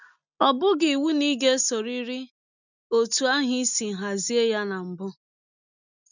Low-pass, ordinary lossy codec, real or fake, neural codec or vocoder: 7.2 kHz; none; real; none